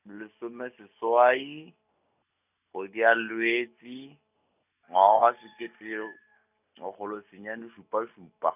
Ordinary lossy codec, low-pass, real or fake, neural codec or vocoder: none; 3.6 kHz; real; none